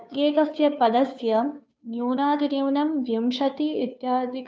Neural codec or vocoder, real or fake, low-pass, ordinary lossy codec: codec, 16 kHz, 2 kbps, FunCodec, trained on Chinese and English, 25 frames a second; fake; none; none